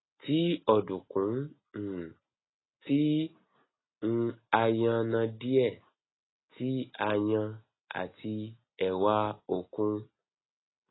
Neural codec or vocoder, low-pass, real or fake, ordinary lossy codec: none; 7.2 kHz; real; AAC, 16 kbps